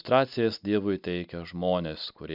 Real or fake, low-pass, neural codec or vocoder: real; 5.4 kHz; none